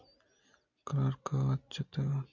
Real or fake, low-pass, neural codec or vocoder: real; 7.2 kHz; none